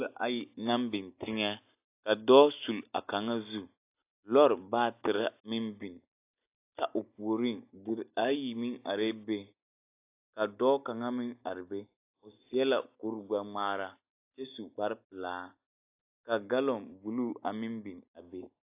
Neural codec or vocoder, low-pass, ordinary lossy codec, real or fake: none; 3.6 kHz; AAC, 24 kbps; real